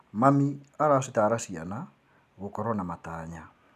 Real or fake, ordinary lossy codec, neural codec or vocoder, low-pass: real; none; none; 14.4 kHz